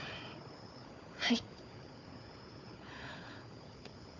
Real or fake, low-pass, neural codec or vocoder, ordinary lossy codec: fake; 7.2 kHz; codec, 16 kHz, 4 kbps, FunCodec, trained on Chinese and English, 50 frames a second; none